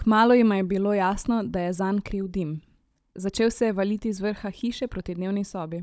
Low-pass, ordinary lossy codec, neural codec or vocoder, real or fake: none; none; codec, 16 kHz, 16 kbps, FunCodec, trained on Chinese and English, 50 frames a second; fake